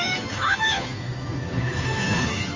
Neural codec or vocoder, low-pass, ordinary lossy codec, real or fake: codec, 16 kHz, 2 kbps, FunCodec, trained on Chinese and English, 25 frames a second; 7.2 kHz; Opus, 32 kbps; fake